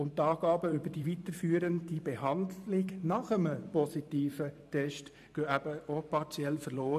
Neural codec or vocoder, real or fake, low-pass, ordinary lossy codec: vocoder, 44.1 kHz, 128 mel bands every 512 samples, BigVGAN v2; fake; 14.4 kHz; AAC, 96 kbps